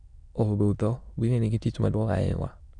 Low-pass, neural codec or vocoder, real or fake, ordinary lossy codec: 9.9 kHz; autoencoder, 22.05 kHz, a latent of 192 numbers a frame, VITS, trained on many speakers; fake; none